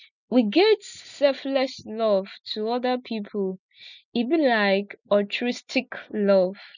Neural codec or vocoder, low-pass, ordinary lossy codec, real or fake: none; 7.2 kHz; none; real